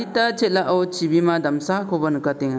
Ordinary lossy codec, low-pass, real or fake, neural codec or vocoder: none; none; real; none